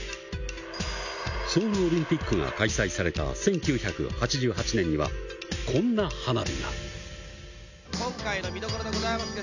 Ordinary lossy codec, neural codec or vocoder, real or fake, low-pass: none; none; real; 7.2 kHz